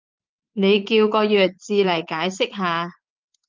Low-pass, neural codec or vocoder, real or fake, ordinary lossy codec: 7.2 kHz; vocoder, 44.1 kHz, 80 mel bands, Vocos; fake; Opus, 24 kbps